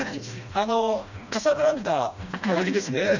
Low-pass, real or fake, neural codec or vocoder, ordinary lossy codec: 7.2 kHz; fake; codec, 16 kHz, 1 kbps, FreqCodec, smaller model; none